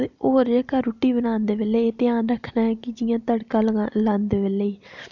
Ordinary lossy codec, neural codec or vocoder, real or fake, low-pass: none; none; real; 7.2 kHz